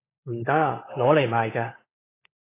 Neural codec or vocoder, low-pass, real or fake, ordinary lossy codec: codec, 16 kHz, 16 kbps, FunCodec, trained on LibriTTS, 50 frames a second; 3.6 kHz; fake; AAC, 16 kbps